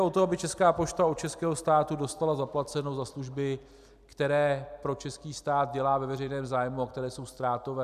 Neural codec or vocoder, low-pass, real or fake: none; 14.4 kHz; real